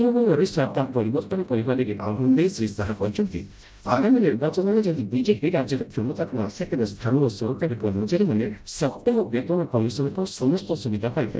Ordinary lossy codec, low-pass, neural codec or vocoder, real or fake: none; none; codec, 16 kHz, 0.5 kbps, FreqCodec, smaller model; fake